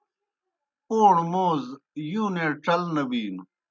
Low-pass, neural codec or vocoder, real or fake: 7.2 kHz; none; real